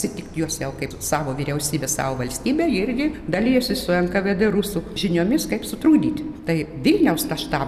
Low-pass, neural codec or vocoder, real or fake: 14.4 kHz; none; real